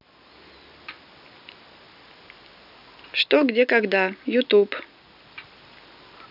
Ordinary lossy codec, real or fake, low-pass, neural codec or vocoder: none; real; 5.4 kHz; none